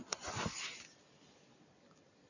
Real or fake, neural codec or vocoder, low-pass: fake; vocoder, 44.1 kHz, 128 mel bands every 256 samples, BigVGAN v2; 7.2 kHz